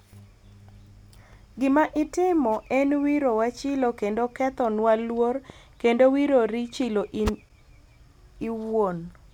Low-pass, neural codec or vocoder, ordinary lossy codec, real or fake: 19.8 kHz; none; none; real